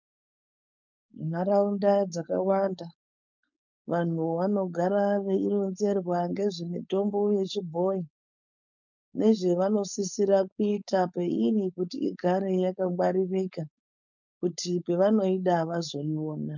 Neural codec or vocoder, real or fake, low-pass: codec, 16 kHz, 4.8 kbps, FACodec; fake; 7.2 kHz